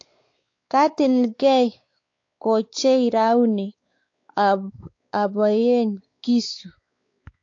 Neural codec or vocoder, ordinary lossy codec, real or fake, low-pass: codec, 16 kHz, 4 kbps, X-Codec, WavLM features, trained on Multilingual LibriSpeech; AAC, 48 kbps; fake; 7.2 kHz